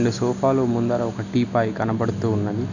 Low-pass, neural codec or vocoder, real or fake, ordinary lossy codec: 7.2 kHz; none; real; none